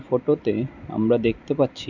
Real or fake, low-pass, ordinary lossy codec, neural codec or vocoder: real; 7.2 kHz; none; none